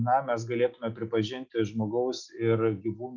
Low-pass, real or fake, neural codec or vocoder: 7.2 kHz; real; none